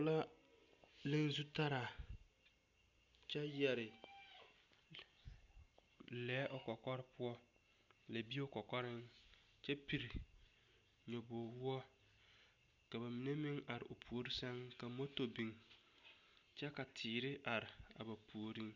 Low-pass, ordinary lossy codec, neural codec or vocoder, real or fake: 7.2 kHz; Opus, 64 kbps; none; real